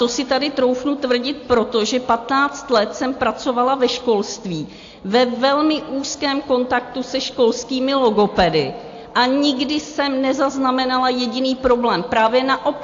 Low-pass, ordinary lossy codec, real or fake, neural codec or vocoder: 7.2 kHz; AAC, 48 kbps; real; none